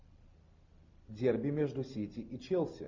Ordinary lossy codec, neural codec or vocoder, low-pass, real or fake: MP3, 64 kbps; none; 7.2 kHz; real